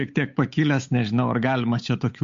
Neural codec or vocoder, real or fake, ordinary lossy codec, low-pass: codec, 16 kHz, 8 kbps, FunCodec, trained on Chinese and English, 25 frames a second; fake; MP3, 48 kbps; 7.2 kHz